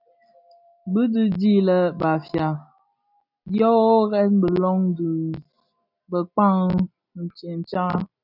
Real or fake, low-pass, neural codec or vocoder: real; 5.4 kHz; none